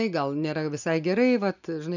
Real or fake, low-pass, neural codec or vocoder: real; 7.2 kHz; none